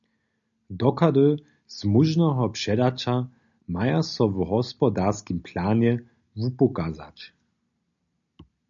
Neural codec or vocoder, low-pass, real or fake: none; 7.2 kHz; real